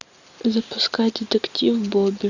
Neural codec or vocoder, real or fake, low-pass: none; real; 7.2 kHz